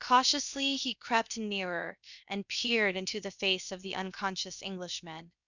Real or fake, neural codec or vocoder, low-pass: fake; codec, 16 kHz, about 1 kbps, DyCAST, with the encoder's durations; 7.2 kHz